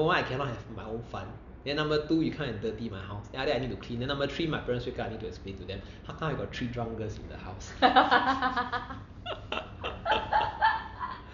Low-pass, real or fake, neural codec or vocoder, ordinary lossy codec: 7.2 kHz; real; none; none